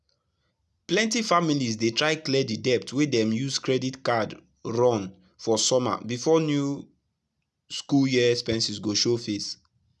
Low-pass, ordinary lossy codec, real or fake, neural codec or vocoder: none; none; real; none